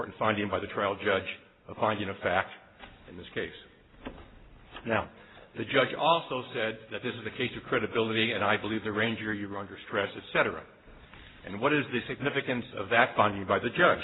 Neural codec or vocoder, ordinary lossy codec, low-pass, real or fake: none; AAC, 16 kbps; 7.2 kHz; real